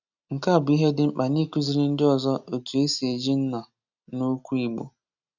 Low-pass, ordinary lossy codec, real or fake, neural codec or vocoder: 7.2 kHz; none; real; none